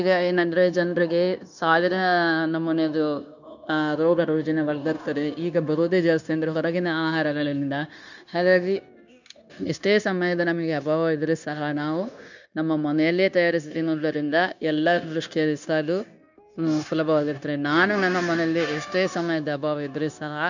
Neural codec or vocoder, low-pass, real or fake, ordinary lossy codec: codec, 16 kHz, 0.9 kbps, LongCat-Audio-Codec; 7.2 kHz; fake; none